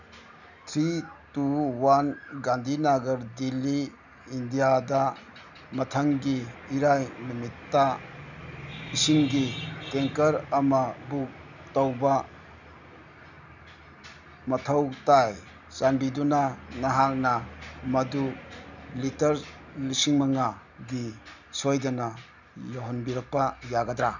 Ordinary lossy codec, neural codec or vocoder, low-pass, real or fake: none; none; 7.2 kHz; real